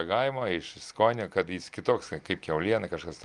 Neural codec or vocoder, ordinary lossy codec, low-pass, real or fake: none; Opus, 32 kbps; 10.8 kHz; real